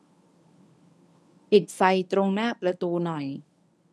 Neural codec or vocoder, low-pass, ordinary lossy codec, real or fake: codec, 24 kHz, 0.9 kbps, WavTokenizer, medium speech release version 1; none; none; fake